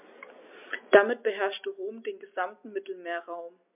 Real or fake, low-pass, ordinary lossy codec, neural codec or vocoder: real; 3.6 kHz; MP3, 32 kbps; none